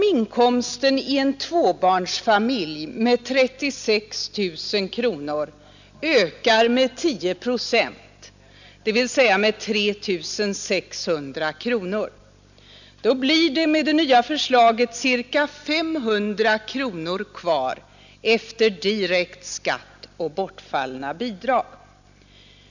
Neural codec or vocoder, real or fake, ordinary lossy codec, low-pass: none; real; none; 7.2 kHz